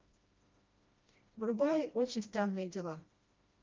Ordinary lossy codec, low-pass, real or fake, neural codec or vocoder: Opus, 24 kbps; 7.2 kHz; fake; codec, 16 kHz, 1 kbps, FreqCodec, smaller model